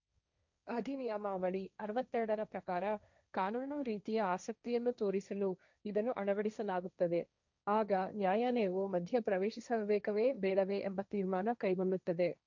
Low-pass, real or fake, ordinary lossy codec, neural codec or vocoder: 7.2 kHz; fake; none; codec, 16 kHz, 1.1 kbps, Voila-Tokenizer